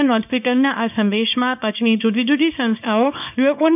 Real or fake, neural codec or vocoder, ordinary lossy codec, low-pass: fake; codec, 24 kHz, 0.9 kbps, WavTokenizer, small release; none; 3.6 kHz